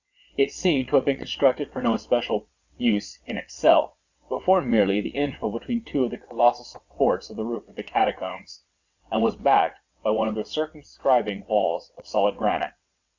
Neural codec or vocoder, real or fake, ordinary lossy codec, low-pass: vocoder, 22.05 kHz, 80 mel bands, WaveNeXt; fake; Opus, 64 kbps; 7.2 kHz